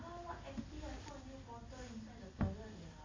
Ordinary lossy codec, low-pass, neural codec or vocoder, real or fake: MP3, 32 kbps; 7.2 kHz; none; real